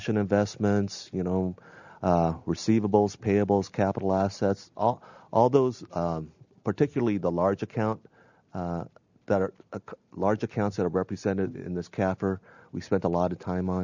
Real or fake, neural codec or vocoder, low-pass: real; none; 7.2 kHz